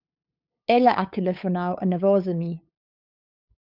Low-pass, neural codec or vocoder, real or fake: 5.4 kHz; codec, 16 kHz, 8 kbps, FunCodec, trained on LibriTTS, 25 frames a second; fake